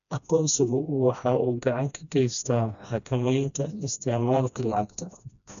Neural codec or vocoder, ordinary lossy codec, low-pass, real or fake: codec, 16 kHz, 1 kbps, FreqCodec, smaller model; none; 7.2 kHz; fake